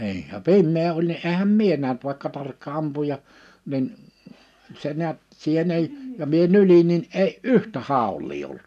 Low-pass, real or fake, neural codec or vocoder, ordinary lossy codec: 14.4 kHz; real; none; none